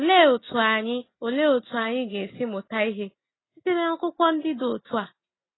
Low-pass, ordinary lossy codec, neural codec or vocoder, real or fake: 7.2 kHz; AAC, 16 kbps; none; real